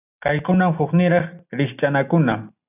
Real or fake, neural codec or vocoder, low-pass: real; none; 3.6 kHz